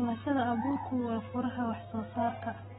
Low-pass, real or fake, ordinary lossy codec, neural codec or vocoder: 19.8 kHz; real; AAC, 16 kbps; none